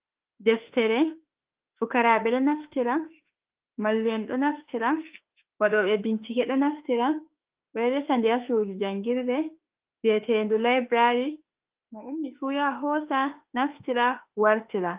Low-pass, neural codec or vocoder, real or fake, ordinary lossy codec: 3.6 kHz; autoencoder, 48 kHz, 32 numbers a frame, DAC-VAE, trained on Japanese speech; fake; Opus, 32 kbps